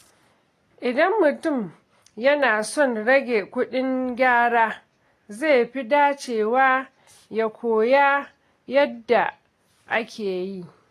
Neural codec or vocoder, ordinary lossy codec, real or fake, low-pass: none; AAC, 48 kbps; real; 14.4 kHz